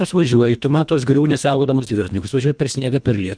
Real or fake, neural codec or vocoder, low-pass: fake; codec, 24 kHz, 1.5 kbps, HILCodec; 9.9 kHz